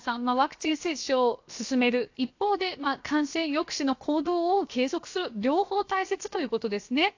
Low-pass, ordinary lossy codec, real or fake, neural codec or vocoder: 7.2 kHz; AAC, 48 kbps; fake; codec, 16 kHz, 0.7 kbps, FocalCodec